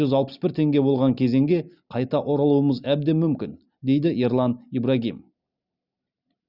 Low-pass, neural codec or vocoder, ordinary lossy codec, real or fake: 5.4 kHz; none; Opus, 64 kbps; real